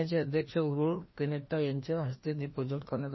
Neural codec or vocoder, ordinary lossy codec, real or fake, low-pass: codec, 16 kHz, 1 kbps, FreqCodec, larger model; MP3, 24 kbps; fake; 7.2 kHz